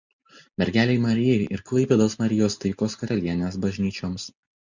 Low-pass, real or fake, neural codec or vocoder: 7.2 kHz; real; none